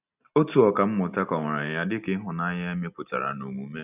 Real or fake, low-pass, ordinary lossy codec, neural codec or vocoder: real; 3.6 kHz; none; none